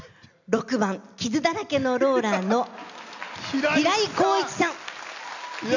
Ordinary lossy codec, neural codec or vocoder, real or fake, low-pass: none; none; real; 7.2 kHz